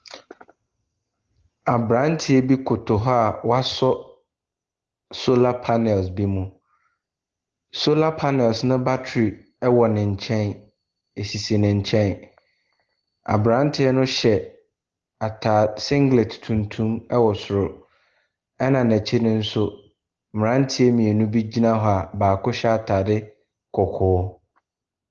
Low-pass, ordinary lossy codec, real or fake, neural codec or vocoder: 7.2 kHz; Opus, 16 kbps; real; none